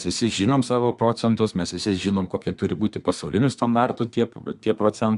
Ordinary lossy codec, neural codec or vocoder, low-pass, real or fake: Opus, 64 kbps; codec, 24 kHz, 1 kbps, SNAC; 10.8 kHz; fake